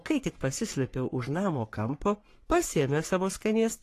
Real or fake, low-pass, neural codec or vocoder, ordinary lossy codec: fake; 14.4 kHz; codec, 44.1 kHz, 3.4 kbps, Pupu-Codec; AAC, 48 kbps